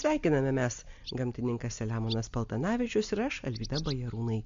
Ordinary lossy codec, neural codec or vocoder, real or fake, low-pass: MP3, 48 kbps; none; real; 7.2 kHz